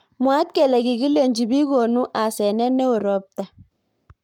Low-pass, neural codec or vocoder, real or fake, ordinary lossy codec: 19.8 kHz; codec, 44.1 kHz, 7.8 kbps, Pupu-Codec; fake; MP3, 96 kbps